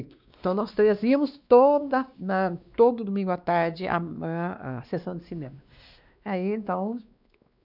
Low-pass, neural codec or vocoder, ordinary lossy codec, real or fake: 5.4 kHz; codec, 16 kHz, 2 kbps, X-Codec, WavLM features, trained on Multilingual LibriSpeech; none; fake